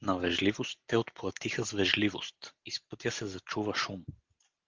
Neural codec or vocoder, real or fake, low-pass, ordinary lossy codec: none; real; 7.2 kHz; Opus, 16 kbps